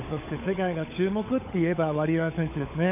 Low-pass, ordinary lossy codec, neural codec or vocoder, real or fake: 3.6 kHz; none; codec, 16 kHz, 16 kbps, FunCodec, trained on LibriTTS, 50 frames a second; fake